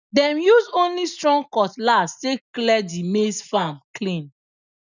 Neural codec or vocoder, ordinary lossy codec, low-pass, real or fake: none; none; 7.2 kHz; real